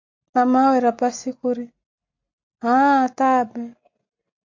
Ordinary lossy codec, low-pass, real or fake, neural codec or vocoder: MP3, 48 kbps; 7.2 kHz; real; none